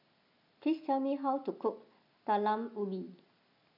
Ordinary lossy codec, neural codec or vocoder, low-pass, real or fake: none; none; 5.4 kHz; real